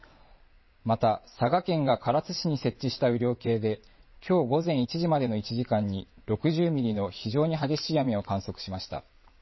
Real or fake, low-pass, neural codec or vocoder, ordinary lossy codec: fake; 7.2 kHz; vocoder, 22.05 kHz, 80 mel bands, WaveNeXt; MP3, 24 kbps